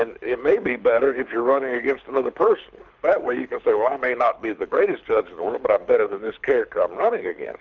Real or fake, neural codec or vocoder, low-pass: fake; codec, 24 kHz, 6 kbps, HILCodec; 7.2 kHz